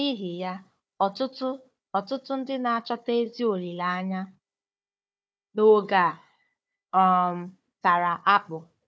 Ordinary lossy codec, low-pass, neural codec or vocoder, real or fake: none; none; codec, 16 kHz, 4 kbps, FunCodec, trained on Chinese and English, 50 frames a second; fake